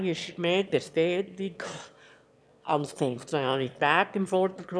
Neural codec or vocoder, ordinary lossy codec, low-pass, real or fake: autoencoder, 22.05 kHz, a latent of 192 numbers a frame, VITS, trained on one speaker; none; none; fake